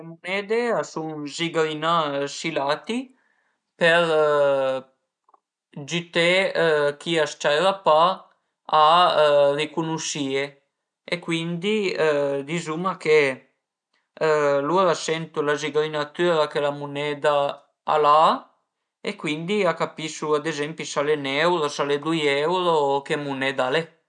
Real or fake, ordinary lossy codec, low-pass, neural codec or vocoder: real; none; 9.9 kHz; none